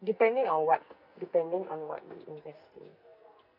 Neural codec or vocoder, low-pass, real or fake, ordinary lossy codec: codec, 44.1 kHz, 2.6 kbps, SNAC; 5.4 kHz; fake; none